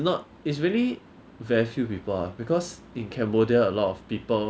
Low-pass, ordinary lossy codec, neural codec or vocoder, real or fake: none; none; none; real